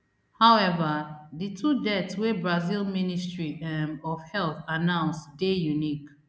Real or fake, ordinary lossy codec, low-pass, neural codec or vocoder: real; none; none; none